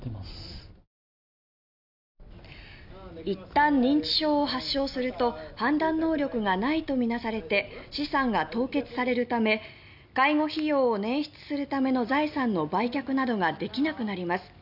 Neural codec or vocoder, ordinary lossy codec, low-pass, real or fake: none; none; 5.4 kHz; real